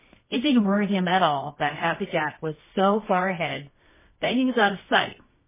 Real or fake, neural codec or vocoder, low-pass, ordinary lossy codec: fake; codec, 24 kHz, 0.9 kbps, WavTokenizer, medium music audio release; 3.6 kHz; MP3, 16 kbps